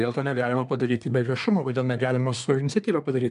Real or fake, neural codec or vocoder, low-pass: fake; codec, 24 kHz, 1 kbps, SNAC; 10.8 kHz